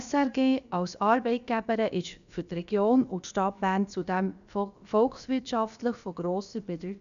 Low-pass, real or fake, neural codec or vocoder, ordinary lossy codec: 7.2 kHz; fake; codec, 16 kHz, about 1 kbps, DyCAST, with the encoder's durations; none